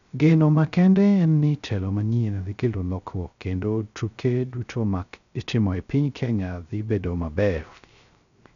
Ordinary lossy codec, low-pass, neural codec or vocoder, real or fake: none; 7.2 kHz; codec, 16 kHz, 0.3 kbps, FocalCodec; fake